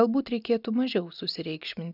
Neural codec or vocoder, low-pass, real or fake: none; 5.4 kHz; real